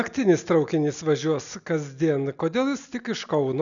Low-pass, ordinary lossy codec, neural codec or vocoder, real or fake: 7.2 kHz; MP3, 96 kbps; none; real